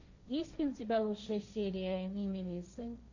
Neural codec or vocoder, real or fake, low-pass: codec, 16 kHz, 1.1 kbps, Voila-Tokenizer; fake; 7.2 kHz